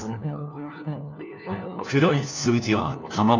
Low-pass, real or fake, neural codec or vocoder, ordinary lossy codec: 7.2 kHz; fake; codec, 16 kHz, 1 kbps, FunCodec, trained on LibriTTS, 50 frames a second; none